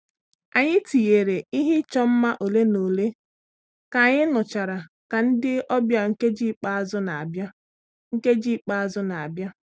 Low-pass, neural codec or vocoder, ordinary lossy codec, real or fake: none; none; none; real